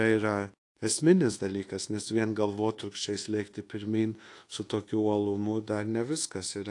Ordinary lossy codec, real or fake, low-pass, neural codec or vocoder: AAC, 48 kbps; fake; 10.8 kHz; codec, 24 kHz, 1.2 kbps, DualCodec